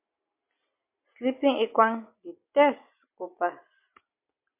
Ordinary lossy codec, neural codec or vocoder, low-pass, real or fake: MP3, 32 kbps; none; 3.6 kHz; real